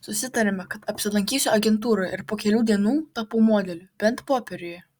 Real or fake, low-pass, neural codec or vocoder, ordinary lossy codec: real; 19.8 kHz; none; Opus, 64 kbps